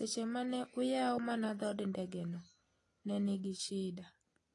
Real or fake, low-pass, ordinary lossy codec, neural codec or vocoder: real; 10.8 kHz; AAC, 32 kbps; none